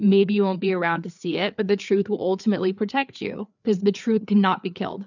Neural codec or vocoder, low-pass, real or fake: codec, 16 kHz, 4 kbps, FreqCodec, larger model; 7.2 kHz; fake